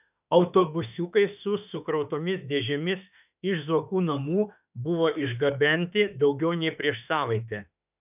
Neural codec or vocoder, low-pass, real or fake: autoencoder, 48 kHz, 32 numbers a frame, DAC-VAE, trained on Japanese speech; 3.6 kHz; fake